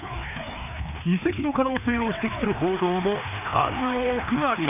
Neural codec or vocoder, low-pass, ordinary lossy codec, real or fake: codec, 16 kHz, 2 kbps, FreqCodec, larger model; 3.6 kHz; none; fake